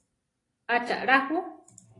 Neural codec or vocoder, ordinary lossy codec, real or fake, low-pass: none; AAC, 32 kbps; real; 10.8 kHz